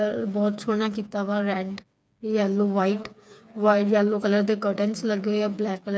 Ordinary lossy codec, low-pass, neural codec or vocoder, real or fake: none; none; codec, 16 kHz, 4 kbps, FreqCodec, smaller model; fake